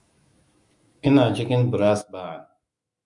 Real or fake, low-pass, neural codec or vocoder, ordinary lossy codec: fake; 10.8 kHz; autoencoder, 48 kHz, 128 numbers a frame, DAC-VAE, trained on Japanese speech; Opus, 64 kbps